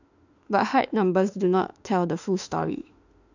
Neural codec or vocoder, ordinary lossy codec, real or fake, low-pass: autoencoder, 48 kHz, 32 numbers a frame, DAC-VAE, trained on Japanese speech; none; fake; 7.2 kHz